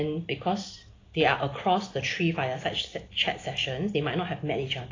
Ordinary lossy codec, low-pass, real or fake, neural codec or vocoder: AAC, 32 kbps; 7.2 kHz; real; none